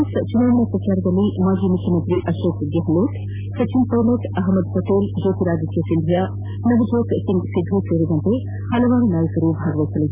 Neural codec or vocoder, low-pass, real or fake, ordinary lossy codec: none; 3.6 kHz; real; Opus, 64 kbps